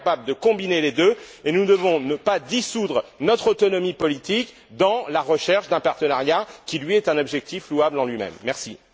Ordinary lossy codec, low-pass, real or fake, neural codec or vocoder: none; none; real; none